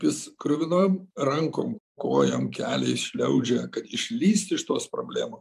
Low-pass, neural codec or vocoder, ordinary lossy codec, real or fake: 14.4 kHz; vocoder, 44.1 kHz, 128 mel bands every 512 samples, BigVGAN v2; AAC, 96 kbps; fake